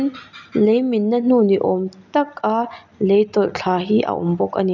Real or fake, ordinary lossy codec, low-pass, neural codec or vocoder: real; none; 7.2 kHz; none